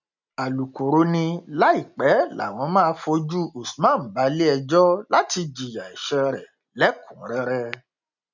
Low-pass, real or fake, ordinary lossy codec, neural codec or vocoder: 7.2 kHz; real; none; none